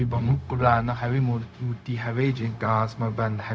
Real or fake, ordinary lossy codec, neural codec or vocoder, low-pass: fake; none; codec, 16 kHz, 0.4 kbps, LongCat-Audio-Codec; none